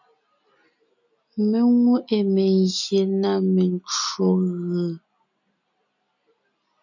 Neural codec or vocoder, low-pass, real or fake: none; 7.2 kHz; real